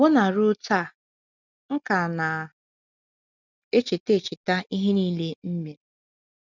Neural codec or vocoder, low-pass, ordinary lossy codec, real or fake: none; 7.2 kHz; none; real